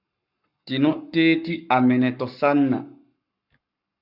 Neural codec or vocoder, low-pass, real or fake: codec, 44.1 kHz, 7.8 kbps, Pupu-Codec; 5.4 kHz; fake